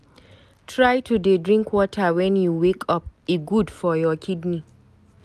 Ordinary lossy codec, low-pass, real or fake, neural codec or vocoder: none; 14.4 kHz; real; none